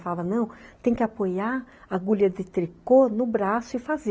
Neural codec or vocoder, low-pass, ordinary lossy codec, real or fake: none; none; none; real